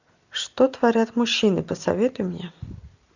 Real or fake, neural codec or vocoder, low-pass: real; none; 7.2 kHz